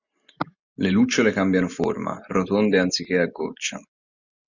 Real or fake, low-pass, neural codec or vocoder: real; 7.2 kHz; none